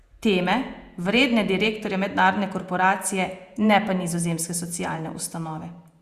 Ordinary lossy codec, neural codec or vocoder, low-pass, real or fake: Opus, 64 kbps; vocoder, 48 kHz, 128 mel bands, Vocos; 14.4 kHz; fake